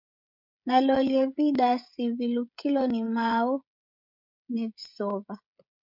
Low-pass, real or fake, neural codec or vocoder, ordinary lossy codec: 5.4 kHz; fake; codec, 16 kHz, 16 kbps, FreqCodec, smaller model; MP3, 48 kbps